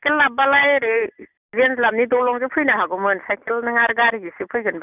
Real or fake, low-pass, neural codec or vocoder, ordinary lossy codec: real; 3.6 kHz; none; none